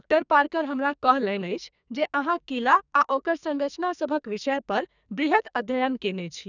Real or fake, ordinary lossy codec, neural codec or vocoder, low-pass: fake; none; codec, 44.1 kHz, 2.6 kbps, SNAC; 7.2 kHz